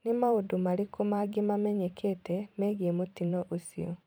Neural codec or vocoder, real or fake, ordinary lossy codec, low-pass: vocoder, 44.1 kHz, 128 mel bands every 512 samples, BigVGAN v2; fake; none; none